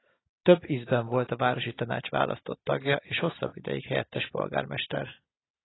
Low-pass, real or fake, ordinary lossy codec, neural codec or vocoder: 7.2 kHz; real; AAC, 16 kbps; none